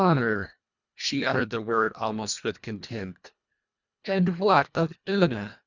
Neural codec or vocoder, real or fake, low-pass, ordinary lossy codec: codec, 24 kHz, 1.5 kbps, HILCodec; fake; 7.2 kHz; Opus, 64 kbps